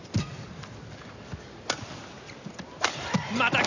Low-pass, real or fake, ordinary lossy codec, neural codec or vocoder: 7.2 kHz; real; none; none